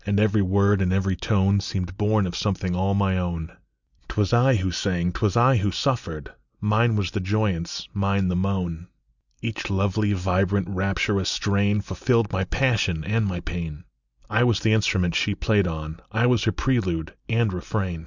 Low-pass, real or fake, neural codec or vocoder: 7.2 kHz; real; none